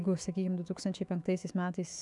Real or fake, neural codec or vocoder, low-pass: fake; autoencoder, 48 kHz, 128 numbers a frame, DAC-VAE, trained on Japanese speech; 10.8 kHz